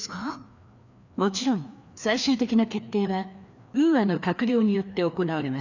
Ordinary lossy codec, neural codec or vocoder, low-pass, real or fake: none; codec, 16 kHz, 2 kbps, FreqCodec, larger model; 7.2 kHz; fake